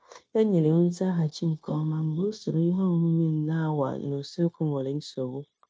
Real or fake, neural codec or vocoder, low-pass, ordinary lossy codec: fake; codec, 16 kHz, 0.9 kbps, LongCat-Audio-Codec; none; none